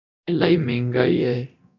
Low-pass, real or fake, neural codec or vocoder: 7.2 kHz; fake; codec, 24 kHz, 0.9 kbps, DualCodec